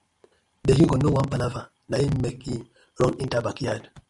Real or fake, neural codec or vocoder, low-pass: real; none; 10.8 kHz